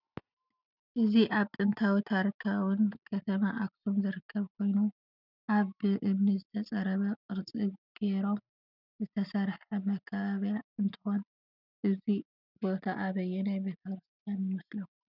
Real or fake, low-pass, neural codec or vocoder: real; 5.4 kHz; none